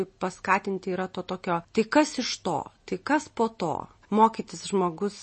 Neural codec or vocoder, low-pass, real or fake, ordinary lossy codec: none; 10.8 kHz; real; MP3, 32 kbps